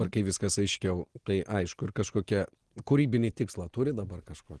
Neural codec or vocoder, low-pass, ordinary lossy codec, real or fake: none; 10.8 kHz; Opus, 16 kbps; real